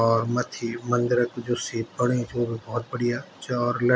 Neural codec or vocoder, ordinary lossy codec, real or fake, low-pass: none; none; real; none